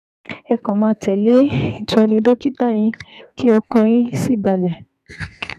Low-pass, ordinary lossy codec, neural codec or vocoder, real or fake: 14.4 kHz; none; codec, 32 kHz, 1.9 kbps, SNAC; fake